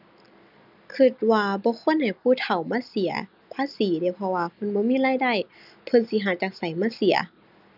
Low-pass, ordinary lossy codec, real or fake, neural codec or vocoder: 5.4 kHz; none; real; none